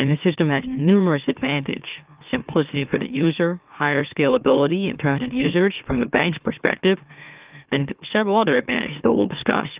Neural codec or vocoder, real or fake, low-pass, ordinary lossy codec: autoencoder, 44.1 kHz, a latent of 192 numbers a frame, MeloTTS; fake; 3.6 kHz; Opus, 24 kbps